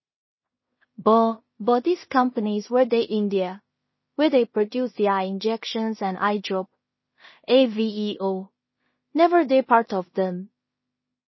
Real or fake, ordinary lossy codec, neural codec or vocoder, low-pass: fake; MP3, 24 kbps; codec, 16 kHz in and 24 kHz out, 0.4 kbps, LongCat-Audio-Codec, two codebook decoder; 7.2 kHz